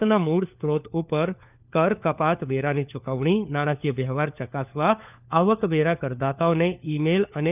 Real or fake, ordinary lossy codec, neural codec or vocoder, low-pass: fake; none; codec, 16 kHz, 4 kbps, FunCodec, trained on LibriTTS, 50 frames a second; 3.6 kHz